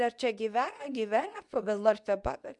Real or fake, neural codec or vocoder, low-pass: fake; codec, 24 kHz, 0.9 kbps, WavTokenizer, medium speech release version 2; 10.8 kHz